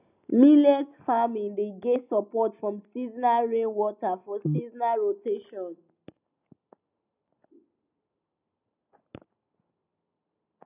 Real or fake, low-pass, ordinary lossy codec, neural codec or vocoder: real; 3.6 kHz; none; none